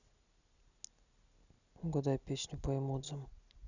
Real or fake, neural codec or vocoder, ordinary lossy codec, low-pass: real; none; none; 7.2 kHz